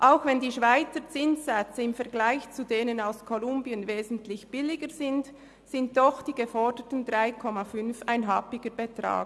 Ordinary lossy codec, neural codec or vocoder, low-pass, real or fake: none; none; none; real